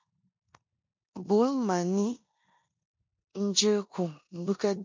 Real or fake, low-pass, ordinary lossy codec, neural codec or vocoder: fake; 7.2 kHz; MP3, 48 kbps; codec, 16 kHz in and 24 kHz out, 0.9 kbps, LongCat-Audio-Codec, four codebook decoder